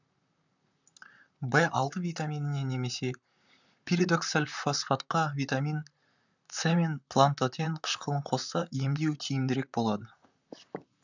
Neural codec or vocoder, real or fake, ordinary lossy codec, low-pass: vocoder, 44.1 kHz, 128 mel bands, Pupu-Vocoder; fake; none; 7.2 kHz